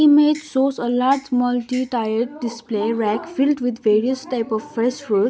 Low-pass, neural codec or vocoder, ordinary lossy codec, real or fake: none; none; none; real